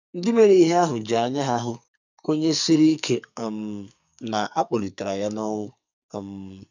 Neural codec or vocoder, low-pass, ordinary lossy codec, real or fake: codec, 44.1 kHz, 2.6 kbps, SNAC; 7.2 kHz; none; fake